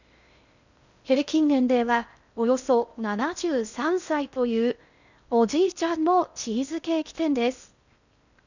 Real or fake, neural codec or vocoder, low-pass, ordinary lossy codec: fake; codec, 16 kHz in and 24 kHz out, 0.6 kbps, FocalCodec, streaming, 2048 codes; 7.2 kHz; none